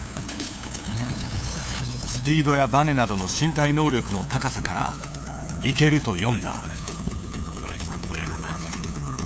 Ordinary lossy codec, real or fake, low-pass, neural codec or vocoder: none; fake; none; codec, 16 kHz, 2 kbps, FunCodec, trained on LibriTTS, 25 frames a second